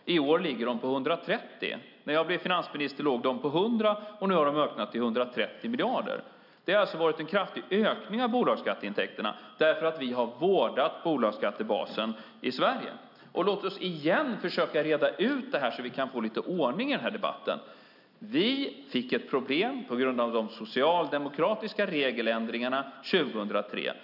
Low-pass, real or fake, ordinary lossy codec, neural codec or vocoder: 5.4 kHz; real; none; none